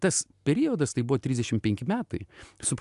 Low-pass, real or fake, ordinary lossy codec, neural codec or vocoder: 10.8 kHz; real; AAC, 96 kbps; none